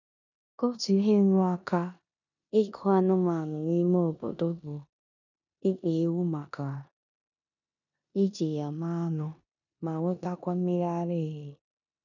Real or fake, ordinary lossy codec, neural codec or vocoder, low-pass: fake; none; codec, 16 kHz in and 24 kHz out, 0.9 kbps, LongCat-Audio-Codec, four codebook decoder; 7.2 kHz